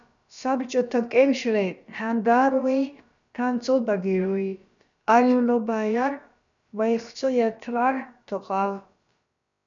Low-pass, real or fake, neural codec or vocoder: 7.2 kHz; fake; codec, 16 kHz, about 1 kbps, DyCAST, with the encoder's durations